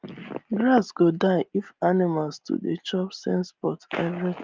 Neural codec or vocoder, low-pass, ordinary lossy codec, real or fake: none; 7.2 kHz; Opus, 32 kbps; real